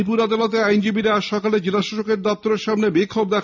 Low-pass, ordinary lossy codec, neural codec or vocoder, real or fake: 7.2 kHz; none; none; real